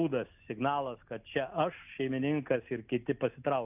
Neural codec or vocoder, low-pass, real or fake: none; 3.6 kHz; real